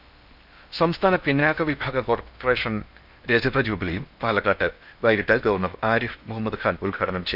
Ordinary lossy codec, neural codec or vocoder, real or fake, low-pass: none; codec, 16 kHz in and 24 kHz out, 0.8 kbps, FocalCodec, streaming, 65536 codes; fake; 5.4 kHz